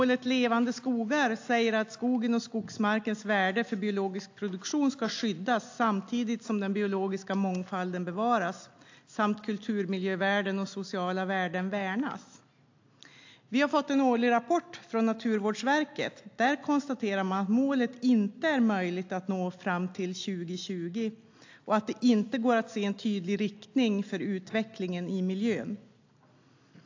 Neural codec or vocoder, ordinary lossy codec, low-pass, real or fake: none; AAC, 48 kbps; 7.2 kHz; real